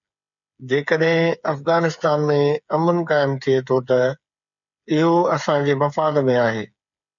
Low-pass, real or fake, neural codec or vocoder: 7.2 kHz; fake; codec, 16 kHz, 8 kbps, FreqCodec, smaller model